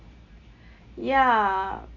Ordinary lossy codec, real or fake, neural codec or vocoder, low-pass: none; real; none; 7.2 kHz